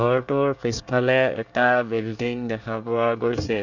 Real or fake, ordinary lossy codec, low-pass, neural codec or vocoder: fake; none; 7.2 kHz; codec, 24 kHz, 1 kbps, SNAC